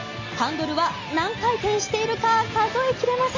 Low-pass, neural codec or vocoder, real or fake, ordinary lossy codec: 7.2 kHz; none; real; MP3, 32 kbps